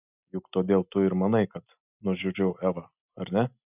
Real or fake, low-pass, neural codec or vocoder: real; 3.6 kHz; none